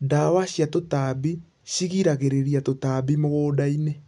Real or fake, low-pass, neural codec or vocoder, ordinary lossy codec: real; 10.8 kHz; none; none